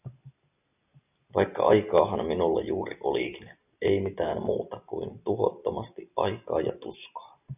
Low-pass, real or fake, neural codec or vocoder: 3.6 kHz; real; none